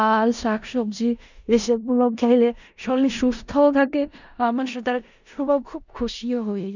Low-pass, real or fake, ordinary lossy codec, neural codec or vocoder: 7.2 kHz; fake; none; codec, 16 kHz in and 24 kHz out, 0.4 kbps, LongCat-Audio-Codec, four codebook decoder